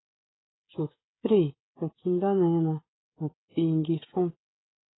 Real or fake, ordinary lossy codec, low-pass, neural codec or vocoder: fake; AAC, 16 kbps; 7.2 kHz; codec, 16 kHz, 16 kbps, FreqCodec, smaller model